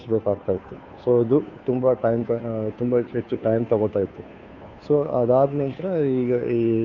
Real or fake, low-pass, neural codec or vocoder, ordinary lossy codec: fake; 7.2 kHz; codec, 16 kHz, 2 kbps, FunCodec, trained on Chinese and English, 25 frames a second; none